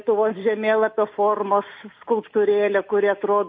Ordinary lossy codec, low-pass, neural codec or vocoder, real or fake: MP3, 32 kbps; 7.2 kHz; none; real